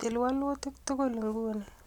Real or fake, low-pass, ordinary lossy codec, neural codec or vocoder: real; 19.8 kHz; none; none